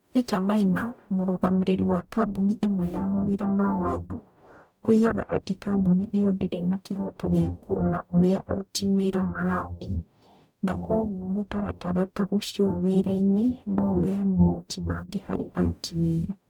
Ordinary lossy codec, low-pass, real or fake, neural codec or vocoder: none; 19.8 kHz; fake; codec, 44.1 kHz, 0.9 kbps, DAC